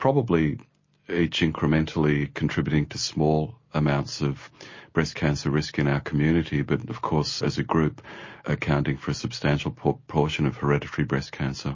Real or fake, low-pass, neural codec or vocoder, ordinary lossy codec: real; 7.2 kHz; none; MP3, 32 kbps